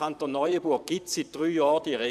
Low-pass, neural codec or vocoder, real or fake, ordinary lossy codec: 14.4 kHz; vocoder, 44.1 kHz, 128 mel bands, Pupu-Vocoder; fake; none